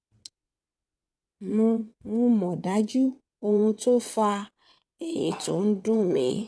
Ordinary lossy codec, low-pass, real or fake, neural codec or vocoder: none; none; fake; vocoder, 22.05 kHz, 80 mel bands, WaveNeXt